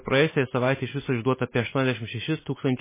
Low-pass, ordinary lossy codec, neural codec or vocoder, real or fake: 3.6 kHz; MP3, 16 kbps; none; real